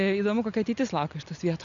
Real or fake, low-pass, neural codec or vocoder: real; 7.2 kHz; none